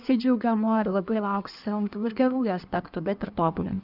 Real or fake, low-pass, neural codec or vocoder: fake; 5.4 kHz; codec, 16 kHz in and 24 kHz out, 1.1 kbps, FireRedTTS-2 codec